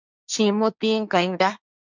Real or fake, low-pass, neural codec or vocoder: fake; 7.2 kHz; codec, 16 kHz, 1.1 kbps, Voila-Tokenizer